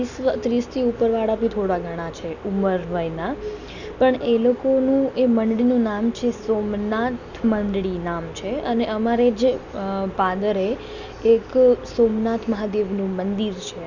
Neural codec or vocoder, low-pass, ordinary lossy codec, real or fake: none; 7.2 kHz; none; real